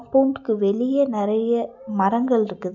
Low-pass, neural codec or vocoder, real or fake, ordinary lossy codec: 7.2 kHz; none; real; none